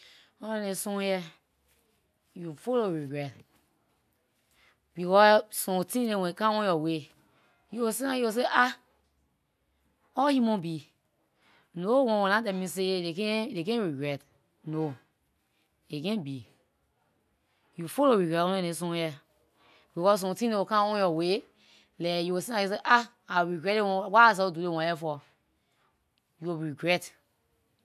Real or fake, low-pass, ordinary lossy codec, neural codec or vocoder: real; 14.4 kHz; none; none